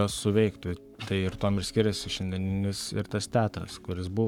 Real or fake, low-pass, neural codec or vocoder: fake; 19.8 kHz; codec, 44.1 kHz, 7.8 kbps, Pupu-Codec